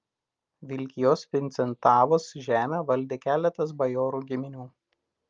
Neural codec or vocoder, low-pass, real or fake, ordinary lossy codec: none; 7.2 kHz; real; Opus, 32 kbps